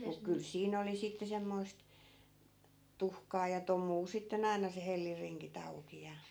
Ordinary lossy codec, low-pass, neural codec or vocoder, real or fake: none; none; none; real